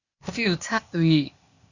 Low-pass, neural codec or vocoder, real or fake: 7.2 kHz; codec, 16 kHz, 0.8 kbps, ZipCodec; fake